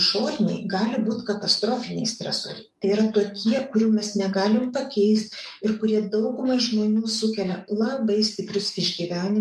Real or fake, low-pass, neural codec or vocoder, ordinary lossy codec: fake; 14.4 kHz; codec, 44.1 kHz, 7.8 kbps, DAC; MP3, 64 kbps